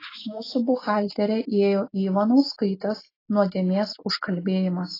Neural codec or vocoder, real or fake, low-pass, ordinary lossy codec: none; real; 5.4 kHz; AAC, 24 kbps